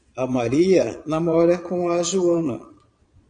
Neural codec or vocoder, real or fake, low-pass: vocoder, 22.05 kHz, 80 mel bands, Vocos; fake; 9.9 kHz